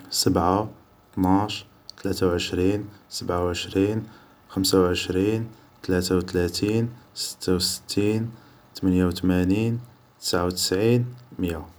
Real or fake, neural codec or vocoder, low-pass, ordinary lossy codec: real; none; none; none